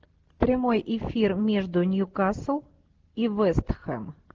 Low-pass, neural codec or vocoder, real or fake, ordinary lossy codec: 7.2 kHz; none; real; Opus, 16 kbps